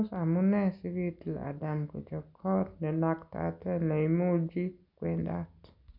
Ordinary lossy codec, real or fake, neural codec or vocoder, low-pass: none; real; none; 5.4 kHz